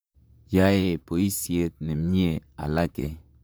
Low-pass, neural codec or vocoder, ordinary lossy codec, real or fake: none; vocoder, 44.1 kHz, 128 mel bands, Pupu-Vocoder; none; fake